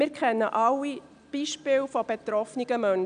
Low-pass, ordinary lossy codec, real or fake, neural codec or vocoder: 9.9 kHz; none; real; none